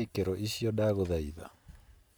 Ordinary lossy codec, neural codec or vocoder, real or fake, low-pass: none; none; real; none